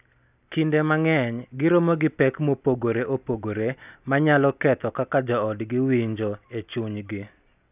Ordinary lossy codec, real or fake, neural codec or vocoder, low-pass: none; real; none; 3.6 kHz